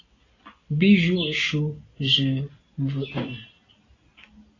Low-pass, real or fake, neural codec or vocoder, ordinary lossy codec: 7.2 kHz; real; none; AAC, 32 kbps